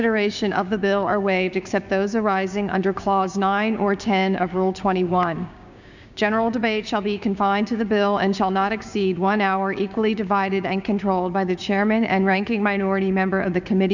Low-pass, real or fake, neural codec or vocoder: 7.2 kHz; fake; codec, 16 kHz, 6 kbps, DAC